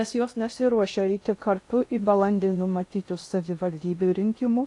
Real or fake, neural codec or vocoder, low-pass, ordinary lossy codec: fake; codec, 16 kHz in and 24 kHz out, 0.6 kbps, FocalCodec, streaming, 2048 codes; 10.8 kHz; AAC, 48 kbps